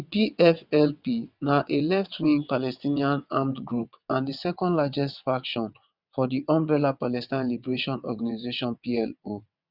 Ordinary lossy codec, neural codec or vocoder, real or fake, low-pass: none; vocoder, 22.05 kHz, 80 mel bands, WaveNeXt; fake; 5.4 kHz